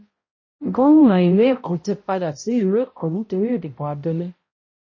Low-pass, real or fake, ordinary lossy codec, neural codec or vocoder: 7.2 kHz; fake; MP3, 32 kbps; codec, 16 kHz, 0.5 kbps, X-Codec, HuBERT features, trained on balanced general audio